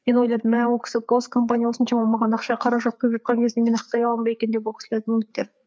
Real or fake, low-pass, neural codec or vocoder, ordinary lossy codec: fake; none; codec, 16 kHz, 4 kbps, FreqCodec, larger model; none